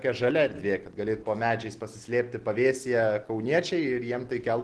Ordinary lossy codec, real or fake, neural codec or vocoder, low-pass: Opus, 16 kbps; real; none; 10.8 kHz